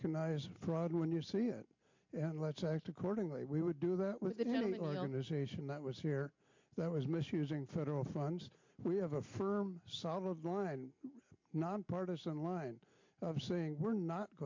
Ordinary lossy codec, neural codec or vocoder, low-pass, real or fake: MP3, 48 kbps; none; 7.2 kHz; real